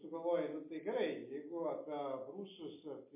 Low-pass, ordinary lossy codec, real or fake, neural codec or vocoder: 3.6 kHz; MP3, 24 kbps; real; none